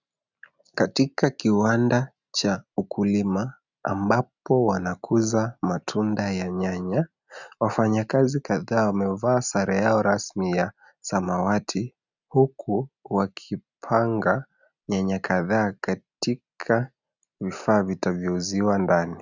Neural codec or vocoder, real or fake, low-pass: none; real; 7.2 kHz